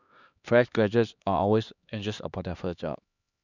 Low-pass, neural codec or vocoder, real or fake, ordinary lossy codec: 7.2 kHz; codec, 16 kHz, 2 kbps, X-Codec, HuBERT features, trained on LibriSpeech; fake; none